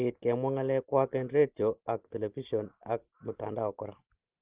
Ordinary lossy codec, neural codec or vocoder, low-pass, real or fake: Opus, 16 kbps; none; 3.6 kHz; real